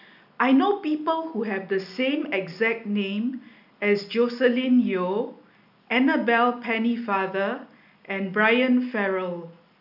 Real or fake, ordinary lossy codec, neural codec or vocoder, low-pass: real; none; none; 5.4 kHz